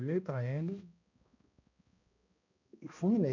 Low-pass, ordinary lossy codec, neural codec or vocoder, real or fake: 7.2 kHz; none; codec, 16 kHz, 1 kbps, X-Codec, HuBERT features, trained on balanced general audio; fake